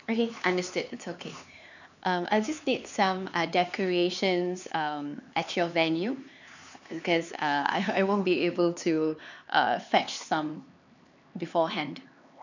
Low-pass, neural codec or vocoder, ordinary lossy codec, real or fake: 7.2 kHz; codec, 16 kHz, 4 kbps, X-Codec, HuBERT features, trained on LibriSpeech; none; fake